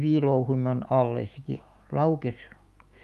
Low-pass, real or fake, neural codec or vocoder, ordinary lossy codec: 14.4 kHz; fake; autoencoder, 48 kHz, 128 numbers a frame, DAC-VAE, trained on Japanese speech; Opus, 32 kbps